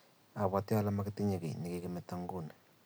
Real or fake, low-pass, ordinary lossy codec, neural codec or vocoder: real; none; none; none